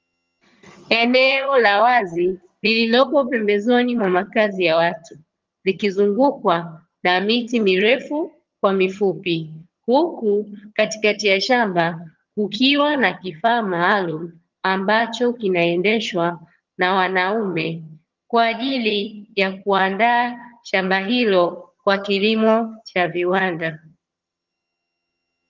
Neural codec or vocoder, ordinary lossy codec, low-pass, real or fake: vocoder, 22.05 kHz, 80 mel bands, HiFi-GAN; Opus, 32 kbps; 7.2 kHz; fake